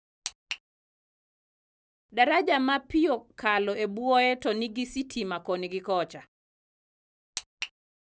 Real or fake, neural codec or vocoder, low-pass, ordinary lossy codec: real; none; none; none